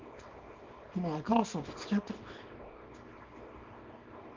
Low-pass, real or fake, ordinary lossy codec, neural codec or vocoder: 7.2 kHz; fake; Opus, 24 kbps; codec, 24 kHz, 0.9 kbps, WavTokenizer, small release